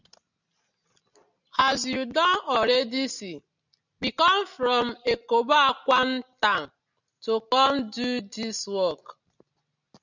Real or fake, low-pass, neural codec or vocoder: real; 7.2 kHz; none